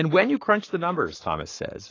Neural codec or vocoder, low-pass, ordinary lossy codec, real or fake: codec, 44.1 kHz, 7.8 kbps, Pupu-Codec; 7.2 kHz; AAC, 32 kbps; fake